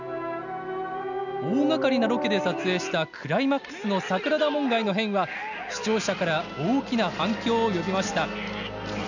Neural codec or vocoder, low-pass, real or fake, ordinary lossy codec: none; 7.2 kHz; real; none